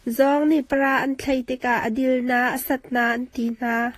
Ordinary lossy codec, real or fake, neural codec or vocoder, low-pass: AAC, 48 kbps; fake; vocoder, 44.1 kHz, 128 mel bands, Pupu-Vocoder; 14.4 kHz